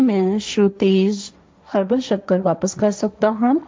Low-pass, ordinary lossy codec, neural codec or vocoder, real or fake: none; none; codec, 16 kHz, 1.1 kbps, Voila-Tokenizer; fake